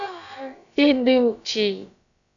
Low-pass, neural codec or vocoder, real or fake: 7.2 kHz; codec, 16 kHz, about 1 kbps, DyCAST, with the encoder's durations; fake